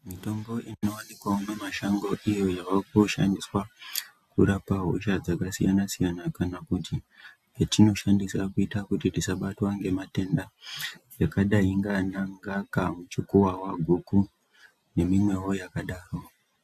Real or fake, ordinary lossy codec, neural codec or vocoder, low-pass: real; AAC, 96 kbps; none; 14.4 kHz